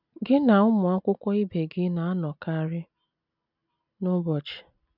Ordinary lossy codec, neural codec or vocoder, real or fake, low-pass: none; none; real; 5.4 kHz